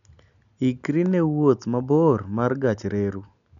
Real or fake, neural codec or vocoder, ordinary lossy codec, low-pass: real; none; none; 7.2 kHz